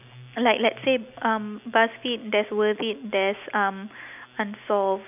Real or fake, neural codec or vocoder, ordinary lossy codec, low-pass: real; none; none; 3.6 kHz